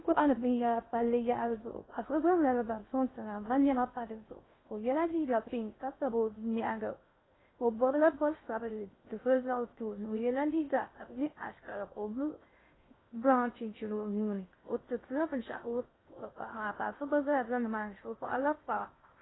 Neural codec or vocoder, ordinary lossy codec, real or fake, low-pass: codec, 16 kHz in and 24 kHz out, 0.6 kbps, FocalCodec, streaming, 2048 codes; AAC, 16 kbps; fake; 7.2 kHz